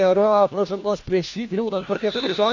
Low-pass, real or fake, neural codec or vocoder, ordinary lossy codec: 7.2 kHz; fake; codec, 16 kHz, 1 kbps, FunCodec, trained on LibriTTS, 50 frames a second; AAC, 48 kbps